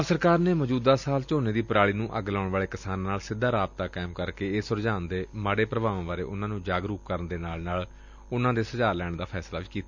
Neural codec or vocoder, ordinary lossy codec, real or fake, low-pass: none; none; real; 7.2 kHz